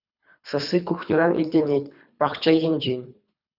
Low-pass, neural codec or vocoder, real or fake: 5.4 kHz; codec, 24 kHz, 3 kbps, HILCodec; fake